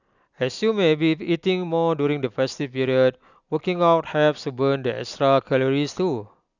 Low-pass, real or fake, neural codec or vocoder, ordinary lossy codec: 7.2 kHz; real; none; none